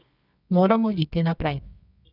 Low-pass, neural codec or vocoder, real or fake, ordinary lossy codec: 5.4 kHz; codec, 24 kHz, 0.9 kbps, WavTokenizer, medium music audio release; fake; none